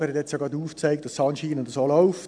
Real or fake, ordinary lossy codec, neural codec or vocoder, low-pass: real; none; none; 9.9 kHz